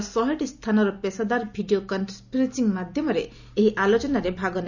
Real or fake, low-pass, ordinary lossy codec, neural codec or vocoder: real; 7.2 kHz; none; none